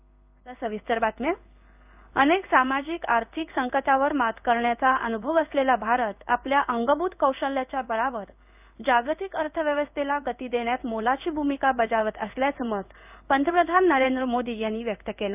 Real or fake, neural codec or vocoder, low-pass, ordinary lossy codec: fake; codec, 16 kHz in and 24 kHz out, 1 kbps, XY-Tokenizer; 3.6 kHz; none